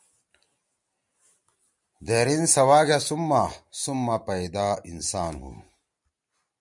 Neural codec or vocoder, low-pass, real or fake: none; 10.8 kHz; real